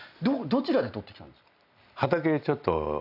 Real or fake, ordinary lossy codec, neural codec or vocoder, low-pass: real; none; none; 5.4 kHz